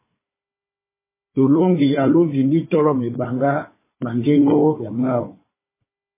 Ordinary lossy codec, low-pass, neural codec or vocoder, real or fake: MP3, 16 kbps; 3.6 kHz; codec, 16 kHz, 4 kbps, FunCodec, trained on Chinese and English, 50 frames a second; fake